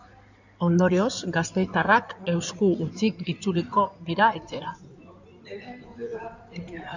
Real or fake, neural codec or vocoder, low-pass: fake; codec, 16 kHz in and 24 kHz out, 2.2 kbps, FireRedTTS-2 codec; 7.2 kHz